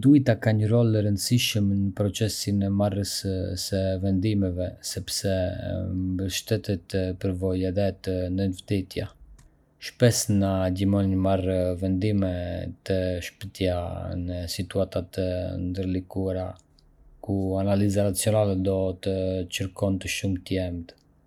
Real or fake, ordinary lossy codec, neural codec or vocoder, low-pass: real; none; none; 19.8 kHz